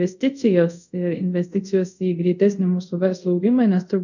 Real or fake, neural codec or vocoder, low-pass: fake; codec, 24 kHz, 0.5 kbps, DualCodec; 7.2 kHz